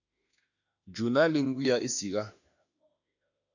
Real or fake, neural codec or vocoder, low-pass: fake; autoencoder, 48 kHz, 32 numbers a frame, DAC-VAE, trained on Japanese speech; 7.2 kHz